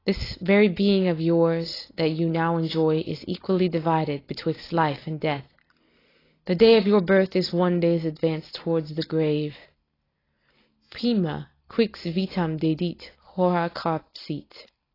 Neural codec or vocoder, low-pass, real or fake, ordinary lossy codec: none; 5.4 kHz; real; AAC, 24 kbps